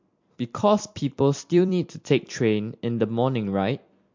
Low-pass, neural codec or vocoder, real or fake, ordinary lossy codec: 7.2 kHz; vocoder, 44.1 kHz, 128 mel bands every 256 samples, BigVGAN v2; fake; MP3, 48 kbps